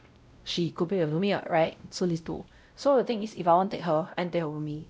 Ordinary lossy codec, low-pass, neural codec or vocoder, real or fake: none; none; codec, 16 kHz, 0.5 kbps, X-Codec, WavLM features, trained on Multilingual LibriSpeech; fake